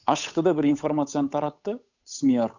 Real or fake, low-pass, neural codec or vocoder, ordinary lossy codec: fake; 7.2 kHz; codec, 16 kHz, 8 kbps, FunCodec, trained on Chinese and English, 25 frames a second; none